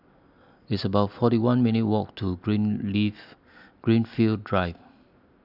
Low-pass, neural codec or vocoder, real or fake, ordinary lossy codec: 5.4 kHz; none; real; none